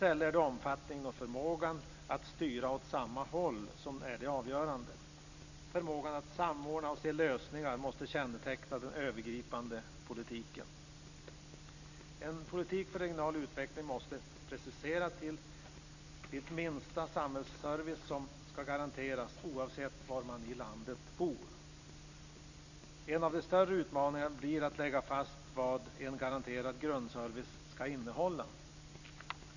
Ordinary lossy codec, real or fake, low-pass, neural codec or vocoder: none; real; 7.2 kHz; none